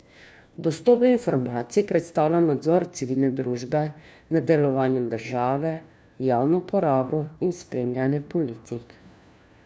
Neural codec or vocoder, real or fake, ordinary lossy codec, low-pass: codec, 16 kHz, 1 kbps, FunCodec, trained on LibriTTS, 50 frames a second; fake; none; none